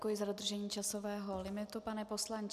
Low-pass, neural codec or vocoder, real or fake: 14.4 kHz; none; real